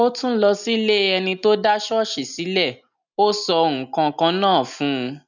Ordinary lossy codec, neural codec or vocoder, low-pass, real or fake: none; none; 7.2 kHz; real